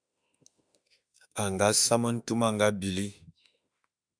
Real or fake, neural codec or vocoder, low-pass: fake; autoencoder, 48 kHz, 32 numbers a frame, DAC-VAE, trained on Japanese speech; 9.9 kHz